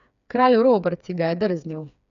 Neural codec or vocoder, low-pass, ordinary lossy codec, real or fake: codec, 16 kHz, 4 kbps, FreqCodec, smaller model; 7.2 kHz; none; fake